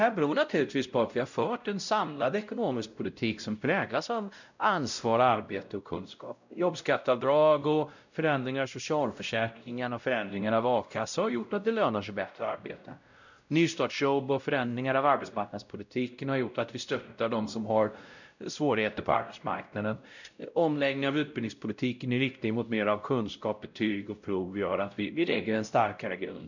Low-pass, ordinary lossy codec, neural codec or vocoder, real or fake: 7.2 kHz; none; codec, 16 kHz, 0.5 kbps, X-Codec, WavLM features, trained on Multilingual LibriSpeech; fake